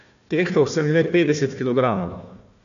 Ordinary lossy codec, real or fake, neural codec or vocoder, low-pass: none; fake; codec, 16 kHz, 1 kbps, FunCodec, trained on Chinese and English, 50 frames a second; 7.2 kHz